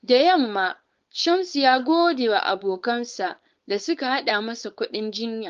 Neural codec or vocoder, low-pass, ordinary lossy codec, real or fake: codec, 16 kHz, 4.8 kbps, FACodec; 7.2 kHz; Opus, 32 kbps; fake